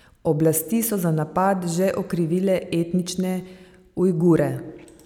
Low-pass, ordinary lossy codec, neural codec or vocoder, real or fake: 19.8 kHz; none; none; real